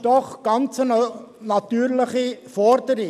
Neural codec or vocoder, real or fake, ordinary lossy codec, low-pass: none; real; none; 14.4 kHz